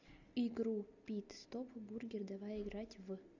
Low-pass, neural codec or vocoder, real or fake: 7.2 kHz; none; real